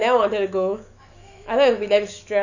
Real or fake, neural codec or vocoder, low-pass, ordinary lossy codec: fake; autoencoder, 48 kHz, 128 numbers a frame, DAC-VAE, trained on Japanese speech; 7.2 kHz; none